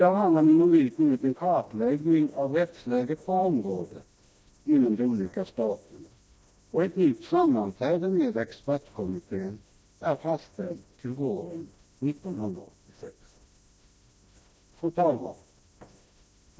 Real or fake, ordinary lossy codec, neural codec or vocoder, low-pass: fake; none; codec, 16 kHz, 1 kbps, FreqCodec, smaller model; none